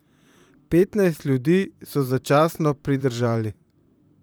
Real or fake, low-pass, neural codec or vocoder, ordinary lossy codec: fake; none; vocoder, 44.1 kHz, 128 mel bands, Pupu-Vocoder; none